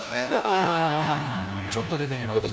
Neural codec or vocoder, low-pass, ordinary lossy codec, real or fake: codec, 16 kHz, 1 kbps, FunCodec, trained on LibriTTS, 50 frames a second; none; none; fake